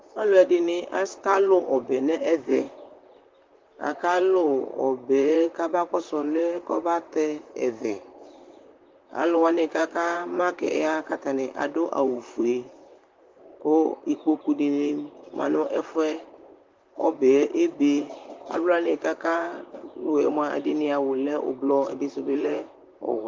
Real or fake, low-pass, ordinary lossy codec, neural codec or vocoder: fake; 7.2 kHz; Opus, 32 kbps; vocoder, 44.1 kHz, 128 mel bands, Pupu-Vocoder